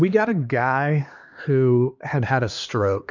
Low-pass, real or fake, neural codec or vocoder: 7.2 kHz; fake; codec, 16 kHz, 2 kbps, X-Codec, HuBERT features, trained on LibriSpeech